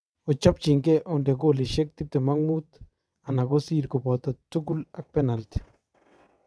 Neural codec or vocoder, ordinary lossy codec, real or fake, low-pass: vocoder, 22.05 kHz, 80 mel bands, WaveNeXt; none; fake; none